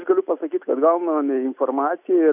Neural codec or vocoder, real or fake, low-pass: none; real; 3.6 kHz